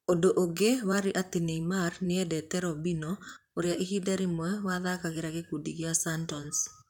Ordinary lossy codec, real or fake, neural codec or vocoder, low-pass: none; fake; vocoder, 44.1 kHz, 128 mel bands, Pupu-Vocoder; 19.8 kHz